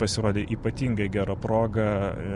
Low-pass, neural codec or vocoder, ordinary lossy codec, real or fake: 10.8 kHz; none; Opus, 64 kbps; real